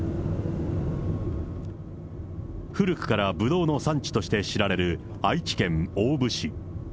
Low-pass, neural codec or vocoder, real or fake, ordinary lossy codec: none; none; real; none